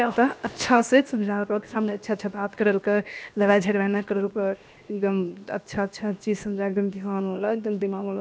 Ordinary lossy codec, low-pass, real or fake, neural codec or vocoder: none; none; fake; codec, 16 kHz, 0.7 kbps, FocalCodec